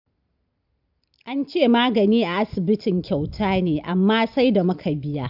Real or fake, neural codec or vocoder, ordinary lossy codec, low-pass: real; none; AAC, 48 kbps; 5.4 kHz